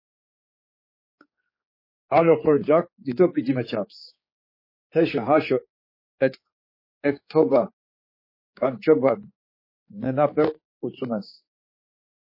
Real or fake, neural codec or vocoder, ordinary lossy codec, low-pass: fake; codec, 16 kHz, 4 kbps, X-Codec, HuBERT features, trained on general audio; MP3, 24 kbps; 5.4 kHz